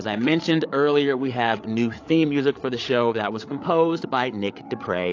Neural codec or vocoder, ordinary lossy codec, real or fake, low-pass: codec, 16 kHz, 8 kbps, FreqCodec, larger model; AAC, 48 kbps; fake; 7.2 kHz